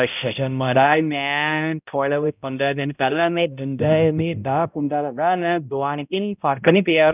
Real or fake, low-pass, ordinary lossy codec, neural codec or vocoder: fake; 3.6 kHz; none; codec, 16 kHz, 0.5 kbps, X-Codec, HuBERT features, trained on balanced general audio